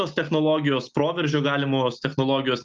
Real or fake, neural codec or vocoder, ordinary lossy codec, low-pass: real; none; Opus, 24 kbps; 7.2 kHz